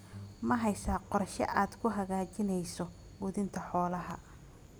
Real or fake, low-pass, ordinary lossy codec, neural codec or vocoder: real; none; none; none